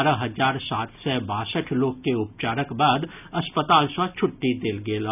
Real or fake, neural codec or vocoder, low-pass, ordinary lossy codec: real; none; 3.6 kHz; none